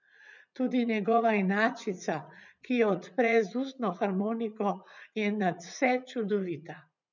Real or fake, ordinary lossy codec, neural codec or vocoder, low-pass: fake; none; vocoder, 44.1 kHz, 80 mel bands, Vocos; 7.2 kHz